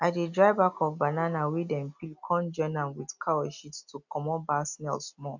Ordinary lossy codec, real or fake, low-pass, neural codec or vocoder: none; real; 7.2 kHz; none